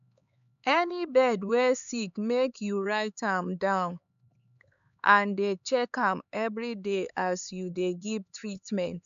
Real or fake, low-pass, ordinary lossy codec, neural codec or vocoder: fake; 7.2 kHz; none; codec, 16 kHz, 4 kbps, X-Codec, HuBERT features, trained on LibriSpeech